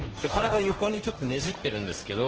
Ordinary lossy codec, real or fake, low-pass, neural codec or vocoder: Opus, 16 kbps; fake; 7.2 kHz; codec, 16 kHz, 1.1 kbps, Voila-Tokenizer